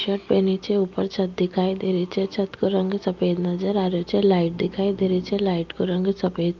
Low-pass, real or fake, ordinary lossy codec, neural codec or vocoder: 7.2 kHz; real; Opus, 24 kbps; none